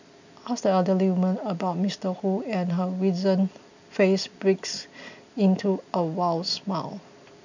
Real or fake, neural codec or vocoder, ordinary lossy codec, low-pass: real; none; none; 7.2 kHz